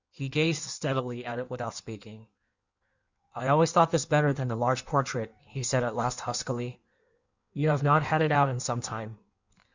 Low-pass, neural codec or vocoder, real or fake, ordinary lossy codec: 7.2 kHz; codec, 16 kHz in and 24 kHz out, 1.1 kbps, FireRedTTS-2 codec; fake; Opus, 64 kbps